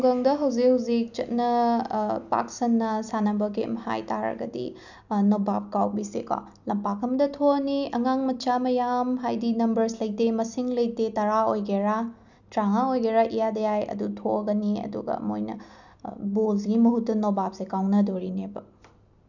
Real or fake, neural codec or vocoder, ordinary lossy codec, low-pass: real; none; none; 7.2 kHz